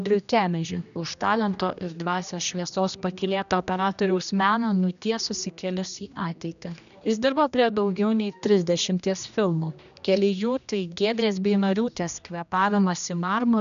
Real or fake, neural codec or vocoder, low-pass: fake; codec, 16 kHz, 1 kbps, X-Codec, HuBERT features, trained on general audio; 7.2 kHz